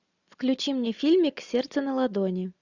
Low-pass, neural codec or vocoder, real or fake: 7.2 kHz; none; real